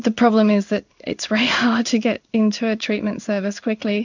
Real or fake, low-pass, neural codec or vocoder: fake; 7.2 kHz; codec, 16 kHz in and 24 kHz out, 1 kbps, XY-Tokenizer